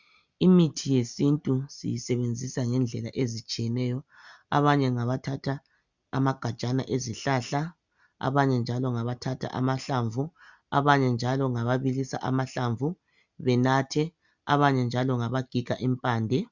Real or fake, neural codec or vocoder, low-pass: real; none; 7.2 kHz